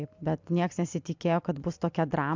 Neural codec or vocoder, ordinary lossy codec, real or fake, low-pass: none; MP3, 64 kbps; real; 7.2 kHz